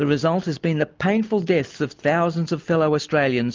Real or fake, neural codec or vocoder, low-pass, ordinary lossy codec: real; none; 7.2 kHz; Opus, 32 kbps